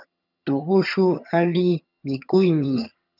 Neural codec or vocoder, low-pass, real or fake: vocoder, 22.05 kHz, 80 mel bands, HiFi-GAN; 5.4 kHz; fake